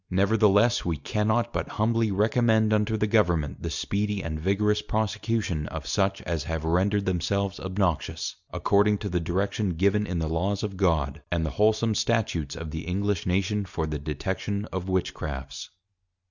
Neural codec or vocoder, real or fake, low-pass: none; real; 7.2 kHz